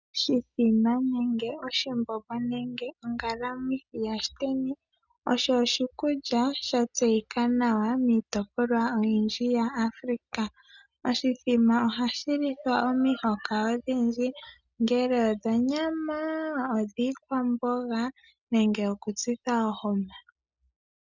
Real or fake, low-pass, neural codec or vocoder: real; 7.2 kHz; none